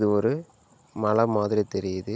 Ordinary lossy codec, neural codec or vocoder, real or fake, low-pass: none; none; real; none